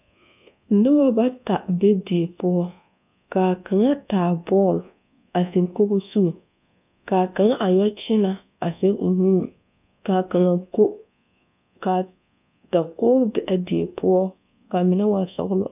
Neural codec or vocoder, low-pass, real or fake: codec, 24 kHz, 1.2 kbps, DualCodec; 3.6 kHz; fake